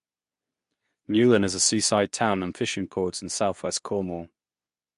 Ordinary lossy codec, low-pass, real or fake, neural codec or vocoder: MP3, 64 kbps; 10.8 kHz; fake; codec, 24 kHz, 0.9 kbps, WavTokenizer, medium speech release version 2